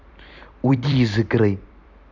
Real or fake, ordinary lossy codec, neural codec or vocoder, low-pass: fake; none; vocoder, 44.1 kHz, 128 mel bands every 256 samples, BigVGAN v2; 7.2 kHz